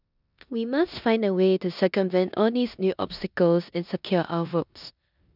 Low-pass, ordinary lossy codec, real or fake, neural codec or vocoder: 5.4 kHz; none; fake; codec, 16 kHz in and 24 kHz out, 0.9 kbps, LongCat-Audio-Codec, four codebook decoder